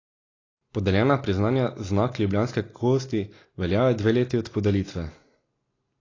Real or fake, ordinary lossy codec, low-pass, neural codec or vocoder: real; AAC, 32 kbps; 7.2 kHz; none